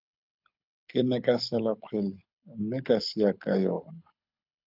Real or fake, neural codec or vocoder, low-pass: fake; codec, 24 kHz, 6 kbps, HILCodec; 5.4 kHz